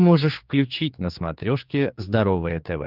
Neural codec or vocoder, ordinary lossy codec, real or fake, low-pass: codec, 16 kHz, 4 kbps, X-Codec, HuBERT features, trained on general audio; Opus, 32 kbps; fake; 5.4 kHz